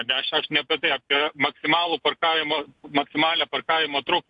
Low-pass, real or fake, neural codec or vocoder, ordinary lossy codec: 10.8 kHz; real; none; AAC, 64 kbps